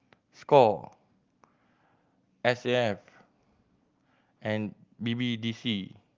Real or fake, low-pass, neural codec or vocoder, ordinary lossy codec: real; 7.2 kHz; none; Opus, 24 kbps